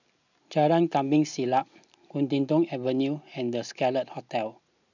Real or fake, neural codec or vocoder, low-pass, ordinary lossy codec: real; none; 7.2 kHz; none